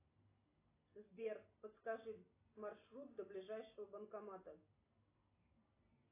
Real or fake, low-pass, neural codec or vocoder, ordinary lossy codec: real; 3.6 kHz; none; AAC, 24 kbps